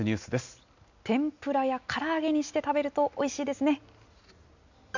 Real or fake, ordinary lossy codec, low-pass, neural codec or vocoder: real; none; 7.2 kHz; none